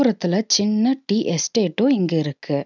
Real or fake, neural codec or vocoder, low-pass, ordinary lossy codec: real; none; 7.2 kHz; none